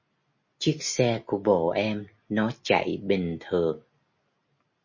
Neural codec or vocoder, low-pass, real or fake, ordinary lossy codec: none; 7.2 kHz; real; MP3, 32 kbps